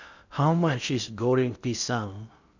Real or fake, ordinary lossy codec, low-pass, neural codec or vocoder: fake; none; 7.2 kHz; codec, 16 kHz in and 24 kHz out, 0.6 kbps, FocalCodec, streaming, 2048 codes